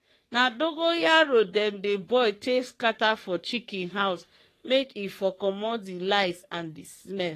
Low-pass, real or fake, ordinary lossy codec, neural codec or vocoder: 14.4 kHz; fake; AAC, 48 kbps; codec, 44.1 kHz, 3.4 kbps, Pupu-Codec